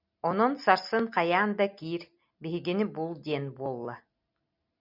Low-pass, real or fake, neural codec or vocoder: 5.4 kHz; real; none